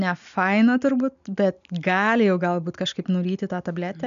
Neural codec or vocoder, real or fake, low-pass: none; real; 7.2 kHz